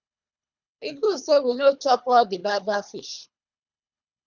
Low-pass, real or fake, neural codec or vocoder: 7.2 kHz; fake; codec, 24 kHz, 3 kbps, HILCodec